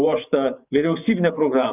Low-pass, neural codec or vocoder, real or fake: 3.6 kHz; vocoder, 24 kHz, 100 mel bands, Vocos; fake